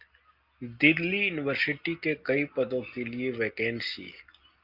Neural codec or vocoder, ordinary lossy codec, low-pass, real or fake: none; Opus, 32 kbps; 5.4 kHz; real